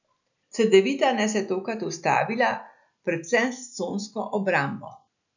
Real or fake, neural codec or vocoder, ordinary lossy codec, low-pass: real; none; none; 7.2 kHz